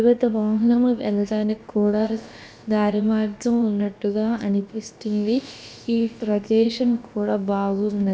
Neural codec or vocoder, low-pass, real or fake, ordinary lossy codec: codec, 16 kHz, about 1 kbps, DyCAST, with the encoder's durations; none; fake; none